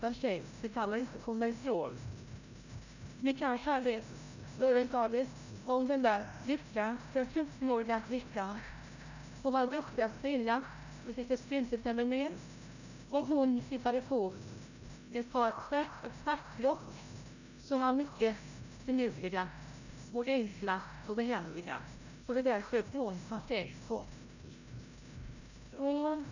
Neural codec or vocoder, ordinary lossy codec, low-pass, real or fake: codec, 16 kHz, 0.5 kbps, FreqCodec, larger model; none; 7.2 kHz; fake